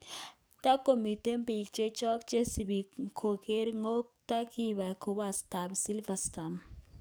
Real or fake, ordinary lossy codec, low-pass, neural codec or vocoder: fake; none; none; codec, 44.1 kHz, 7.8 kbps, DAC